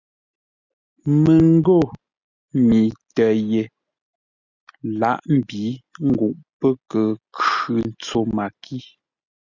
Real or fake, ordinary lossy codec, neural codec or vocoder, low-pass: real; Opus, 64 kbps; none; 7.2 kHz